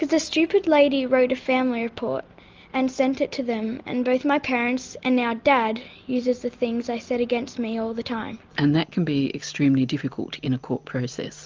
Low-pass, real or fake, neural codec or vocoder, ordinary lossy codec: 7.2 kHz; real; none; Opus, 24 kbps